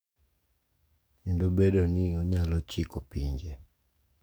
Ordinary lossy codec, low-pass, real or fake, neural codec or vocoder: none; none; fake; codec, 44.1 kHz, 7.8 kbps, DAC